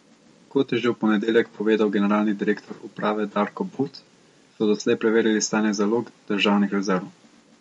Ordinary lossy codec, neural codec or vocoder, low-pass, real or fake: MP3, 48 kbps; none; 19.8 kHz; real